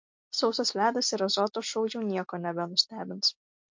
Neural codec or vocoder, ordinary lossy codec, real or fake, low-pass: none; MP3, 48 kbps; real; 7.2 kHz